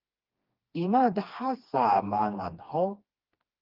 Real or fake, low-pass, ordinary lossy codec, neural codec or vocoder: fake; 5.4 kHz; Opus, 32 kbps; codec, 16 kHz, 2 kbps, FreqCodec, smaller model